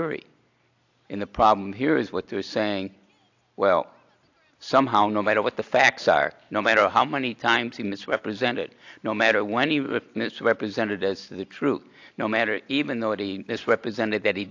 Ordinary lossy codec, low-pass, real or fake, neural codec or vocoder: AAC, 48 kbps; 7.2 kHz; real; none